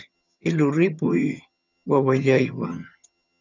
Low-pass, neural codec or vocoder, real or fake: 7.2 kHz; vocoder, 22.05 kHz, 80 mel bands, HiFi-GAN; fake